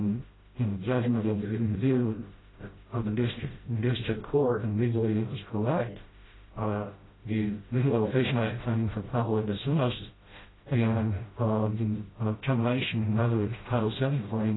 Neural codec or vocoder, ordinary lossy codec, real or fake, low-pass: codec, 16 kHz, 0.5 kbps, FreqCodec, smaller model; AAC, 16 kbps; fake; 7.2 kHz